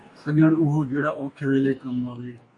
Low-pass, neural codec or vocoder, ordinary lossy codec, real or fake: 10.8 kHz; codec, 44.1 kHz, 2.6 kbps, DAC; MP3, 64 kbps; fake